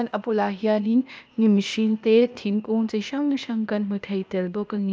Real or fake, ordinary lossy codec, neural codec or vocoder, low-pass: fake; none; codec, 16 kHz, 0.8 kbps, ZipCodec; none